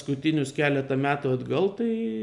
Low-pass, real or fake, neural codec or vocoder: 10.8 kHz; real; none